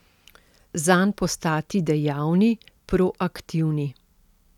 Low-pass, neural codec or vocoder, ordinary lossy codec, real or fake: 19.8 kHz; none; none; real